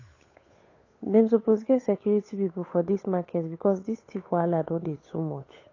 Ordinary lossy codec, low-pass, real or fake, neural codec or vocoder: AAC, 32 kbps; 7.2 kHz; fake; codec, 16 kHz, 8 kbps, FunCodec, trained on Chinese and English, 25 frames a second